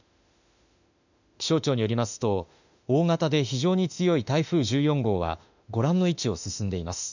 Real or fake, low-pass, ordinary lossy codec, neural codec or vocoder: fake; 7.2 kHz; none; autoencoder, 48 kHz, 32 numbers a frame, DAC-VAE, trained on Japanese speech